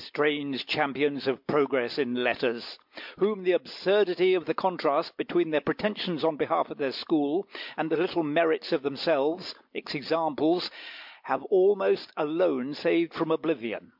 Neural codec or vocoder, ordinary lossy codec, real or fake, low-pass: none; MP3, 48 kbps; real; 5.4 kHz